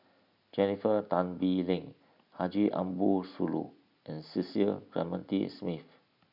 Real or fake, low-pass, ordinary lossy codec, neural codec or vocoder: real; 5.4 kHz; none; none